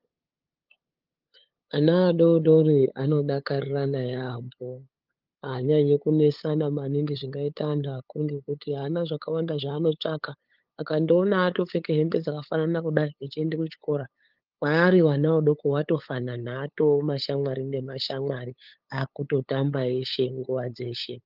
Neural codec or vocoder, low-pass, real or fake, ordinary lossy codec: codec, 16 kHz, 8 kbps, FunCodec, trained on LibriTTS, 25 frames a second; 5.4 kHz; fake; Opus, 24 kbps